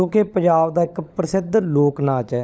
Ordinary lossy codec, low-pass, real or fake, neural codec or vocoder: none; none; fake; codec, 16 kHz, 16 kbps, FunCodec, trained on LibriTTS, 50 frames a second